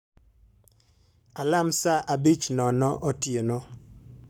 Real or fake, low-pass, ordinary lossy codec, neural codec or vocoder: fake; none; none; codec, 44.1 kHz, 7.8 kbps, Pupu-Codec